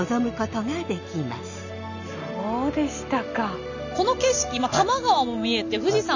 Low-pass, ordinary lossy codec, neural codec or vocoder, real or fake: 7.2 kHz; none; none; real